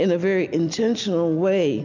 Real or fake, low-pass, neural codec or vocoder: real; 7.2 kHz; none